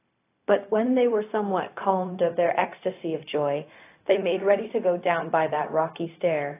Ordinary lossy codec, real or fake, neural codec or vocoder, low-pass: AAC, 24 kbps; fake; codec, 16 kHz, 0.4 kbps, LongCat-Audio-Codec; 3.6 kHz